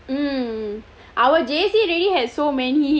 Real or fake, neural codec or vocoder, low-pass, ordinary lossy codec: real; none; none; none